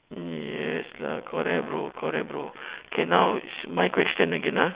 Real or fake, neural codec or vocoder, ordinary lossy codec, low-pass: fake; vocoder, 22.05 kHz, 80 mel bands, WaveNeXt; Opus, 64 kbps; 3.6 kHz